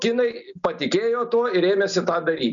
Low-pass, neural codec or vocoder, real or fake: 7.2 kHz; none; real